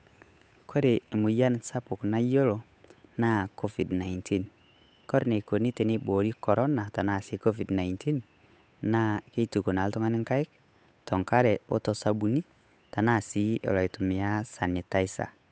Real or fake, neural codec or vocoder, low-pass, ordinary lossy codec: fake; codec, 16 kHz, 8 kbps, FunCodec, trained on Chinese and English, 25 frames a second; none; none